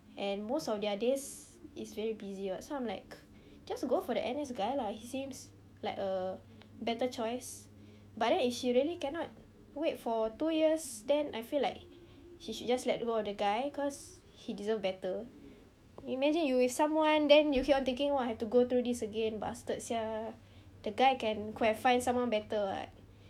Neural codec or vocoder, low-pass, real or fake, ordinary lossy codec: autoencoder, 48 kHz, 128 numbers a frame, DAC-VAE, trained on Japanese speech; 19.8 kHz; fake; none